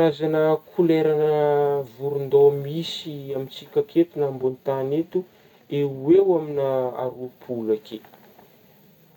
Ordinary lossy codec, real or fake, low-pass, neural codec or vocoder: MP3, 96 kbps; real; 19.8 kHz; none